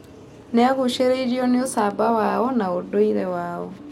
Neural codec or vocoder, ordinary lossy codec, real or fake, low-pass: none; none; real; 19.8 kHz